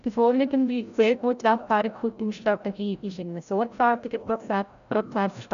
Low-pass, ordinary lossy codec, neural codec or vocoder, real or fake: 7.2 kHz; none; codec, 16 kHz, 0.5 kbps, FreqCodec, larger model; fake